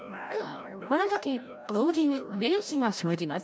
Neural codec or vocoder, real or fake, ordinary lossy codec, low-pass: codec, 16 kHz, 0.5 kbps, FreqCodec, larger model; fake; none; none